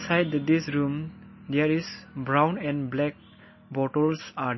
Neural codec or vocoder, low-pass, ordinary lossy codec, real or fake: none; 7.2 kHz; MP3, 24 kbps; real